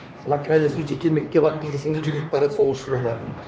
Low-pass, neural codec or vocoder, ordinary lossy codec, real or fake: none; codec, 16 kHz, 2 kbps, X-Codec, HuBERT features, trained on LibriSpeech; none; fake